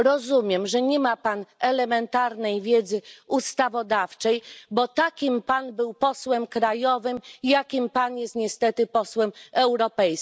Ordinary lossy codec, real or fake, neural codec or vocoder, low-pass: none; real; none; none